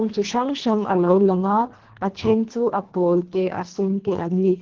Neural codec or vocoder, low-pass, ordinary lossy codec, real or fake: codec, 24 kHz, 1.5 kbps, HILCodec; 7.2 kHz; Opus, 16 kbps; fake